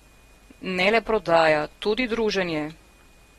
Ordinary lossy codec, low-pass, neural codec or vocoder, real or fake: AAC, 32 kbps; 19.8 kHz; none; real